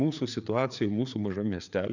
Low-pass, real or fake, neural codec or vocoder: 7.2 kHz; fake; codec, 16 kHz, 4 kbps, FreqCodec, larger model